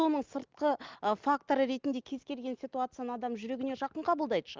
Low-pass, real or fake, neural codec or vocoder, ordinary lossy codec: 7.2 kHz; real; none; Opus, 32 kbps